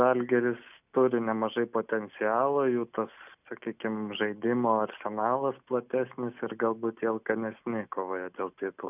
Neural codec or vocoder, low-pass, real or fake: none; 3.6 kHz; real